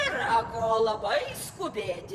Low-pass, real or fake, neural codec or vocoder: 14.4 kHz; fake; vocoder, 44.1 kHz, 128 mel bands, Pupu-Vocoder